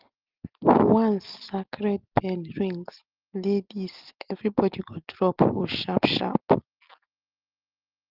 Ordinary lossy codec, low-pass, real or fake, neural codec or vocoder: Opus, 16 kbps; 5.4 kHz; real; none